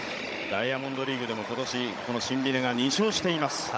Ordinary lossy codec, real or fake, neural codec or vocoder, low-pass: none; fake; codec, 16 kHz, 16 kbps, FunCodec, trained on Chinese and English, 50 frames a second; none